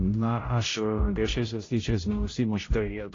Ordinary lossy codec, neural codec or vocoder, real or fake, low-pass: AAC, 32 kbps; codec, 16 kHz, 0.5 kbps, X-Codec, HuBERT features, trained on general audio; fake; 7.2 kHz